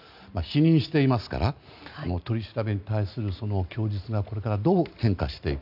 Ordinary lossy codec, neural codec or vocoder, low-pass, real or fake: none; none; 5.4 kHz; real